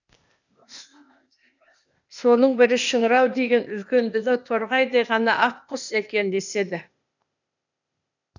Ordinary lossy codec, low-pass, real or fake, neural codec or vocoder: none; 7.2 kHz; fake; codec, 16 kHz, 0.8 kbps, ZipCodec